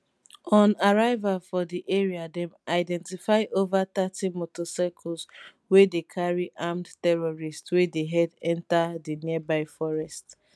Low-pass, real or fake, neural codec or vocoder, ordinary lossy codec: none; real; none; none